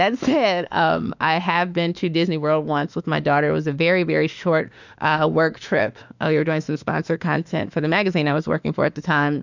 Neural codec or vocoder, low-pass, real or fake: autoencoder, 48 kHz, 32 numbers a frame, DAC-VAE, trained on Japanese speech; 7.2 kHz; fake